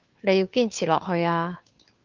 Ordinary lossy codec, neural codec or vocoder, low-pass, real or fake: Opus, 32 kbps; codec, 16 kHz, 2 kbps, FunCodec, trained on Chinese and English, 25 frames a second; 7.2 kHz; fake